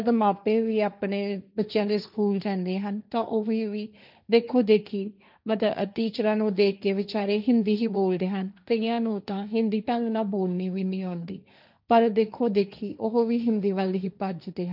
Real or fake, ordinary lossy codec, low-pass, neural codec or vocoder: fake; none; 5.4 kHz; codec, 16 kHz, 1.1 kbps, Voila-Tokenizer